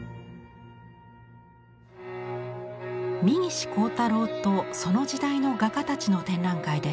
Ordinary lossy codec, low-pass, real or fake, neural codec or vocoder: none; none; real; none